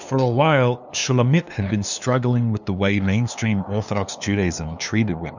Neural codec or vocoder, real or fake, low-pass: codec, 16 kHz, 2 kbps, FunCodec, trained on LibriTTS, 25 frames a second; fake; 7.2 kHz